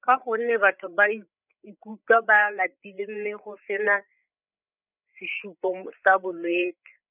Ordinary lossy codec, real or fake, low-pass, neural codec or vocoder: none; fake; 3.6 kHz; codec, 16 kHz, 8 kbps, FreqCodec, larger model